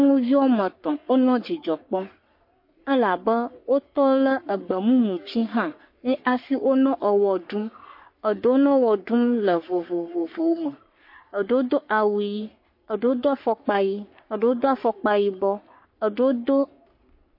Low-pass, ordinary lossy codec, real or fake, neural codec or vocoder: 5.4 kHz; MP3, 32 kbps; fake; codec, 44.1 kHz, 3.4 kbps, Pupu-Codec